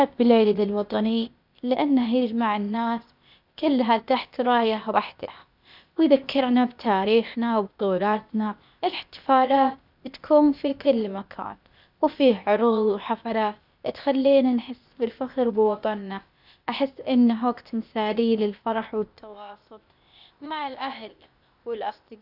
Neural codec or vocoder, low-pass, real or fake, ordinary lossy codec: codec, 16 kHz, 0.8 kbps, ZipCodec; 5.4 kHz; fake; none